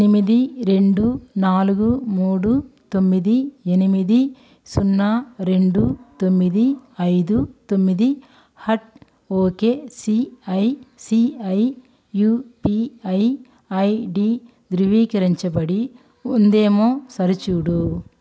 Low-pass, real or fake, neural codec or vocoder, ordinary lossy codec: none; real; none; none